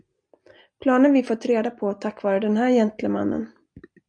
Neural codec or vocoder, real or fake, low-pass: none; real; 9.9 kHz